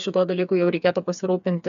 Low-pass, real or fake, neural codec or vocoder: 7.2 kHz; fake; codec, 16 kHz, 4 kbps, FreqCodec, smaller model